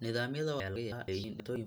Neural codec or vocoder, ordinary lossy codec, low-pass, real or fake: none; none; none; real